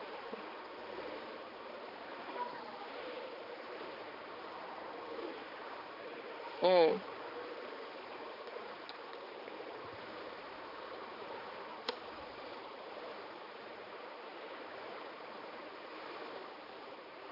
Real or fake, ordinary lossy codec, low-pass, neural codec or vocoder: fake; AAC, 48 kbps; 5.4 kHz; codec, 16 kHz, 4 kbps, X-Codec, HuBERT features, trained on balanced general audio